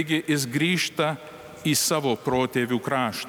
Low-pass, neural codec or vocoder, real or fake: 19.8 kHz; none; real